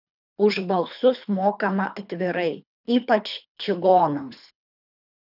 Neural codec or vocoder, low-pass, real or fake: codec, 24 kHz, 3 kbps, HILCodec; 5.4 kHz; fake